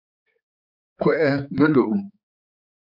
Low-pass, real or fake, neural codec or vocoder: 5.4 kHz; fake; codec, 16 kHz, 4 kbps, X-Codec, HuBERT features, trained on balanced general audio